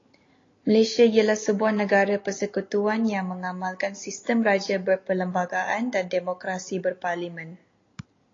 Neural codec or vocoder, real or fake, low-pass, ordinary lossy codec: none; real; 7.2 kHz; AAC, 32 kbps